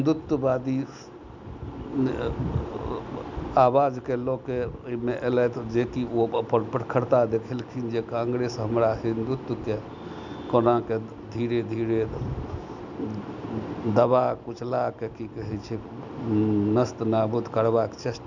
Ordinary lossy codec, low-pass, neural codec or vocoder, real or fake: none; 7.2 kHz; none; real